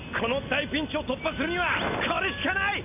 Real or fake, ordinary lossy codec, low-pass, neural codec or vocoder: real; AAC, 32 kbps; 3.6 kHz; none